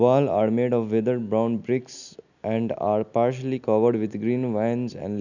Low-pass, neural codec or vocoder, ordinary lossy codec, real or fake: 7.2 kHz; none; none; real